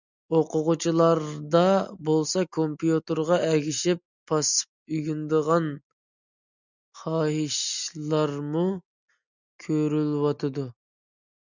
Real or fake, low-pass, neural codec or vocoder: real; 7.2 kHz; none